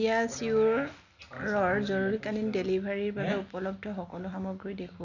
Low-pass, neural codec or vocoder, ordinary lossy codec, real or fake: 7.2 kHz; none; none; real